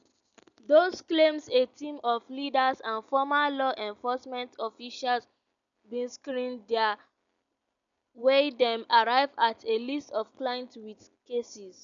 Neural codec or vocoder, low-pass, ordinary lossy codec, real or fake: none; 7.2 kHz; none; real